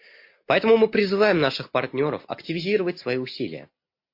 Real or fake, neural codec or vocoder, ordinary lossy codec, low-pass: real; none; MP3, 32 kbps; 5.4 kHz